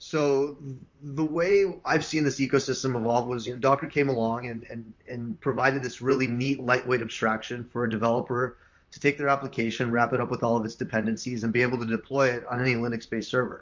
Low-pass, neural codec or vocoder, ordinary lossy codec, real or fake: 7.2 kHz; vocoder, 44.1 kHz, 128 mel bands, Pupu-Vocoder; MP3, 48 kbps; fake